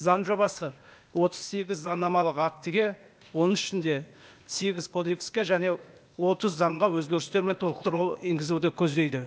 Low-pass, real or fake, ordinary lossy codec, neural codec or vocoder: none; fake; none; codec, 16 kHz, 0.8 kbps, ZipCodec